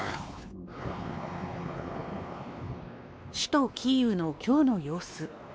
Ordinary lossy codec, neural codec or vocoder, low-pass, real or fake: none; codec, 16 kHz, 2 kbps, X-Codec, WavLM features, trained on Multilingual LibriSpeech; none; fake